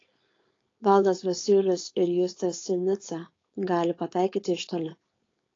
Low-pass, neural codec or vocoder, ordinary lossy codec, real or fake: 7.2 kHz; codec, 16 kHz, 4.8 kbps, FACodec; AAC, 32 kbps; fake